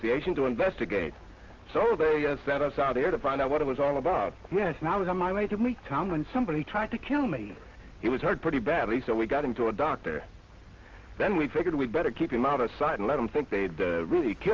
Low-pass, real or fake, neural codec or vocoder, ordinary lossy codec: 7.2 kHz; real; none; Opus, 24 kbps